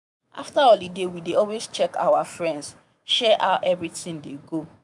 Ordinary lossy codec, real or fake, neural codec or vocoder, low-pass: none; fake; autoencoder, 48 kHz, 128 numbers a frame, DAC-VAE, trained on Japanese speech; 10.8 kHz